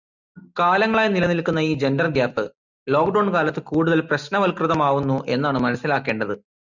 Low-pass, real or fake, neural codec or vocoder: 7.2 kHz; real; none